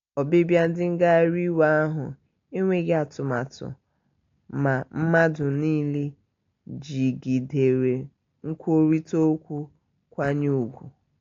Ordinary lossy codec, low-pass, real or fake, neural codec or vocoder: AAC, 48 kbps; 7.2 kHz; real; none